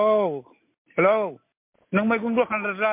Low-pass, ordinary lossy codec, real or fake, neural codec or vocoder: 3.6 kHz; MP3, 24 kbps; real; none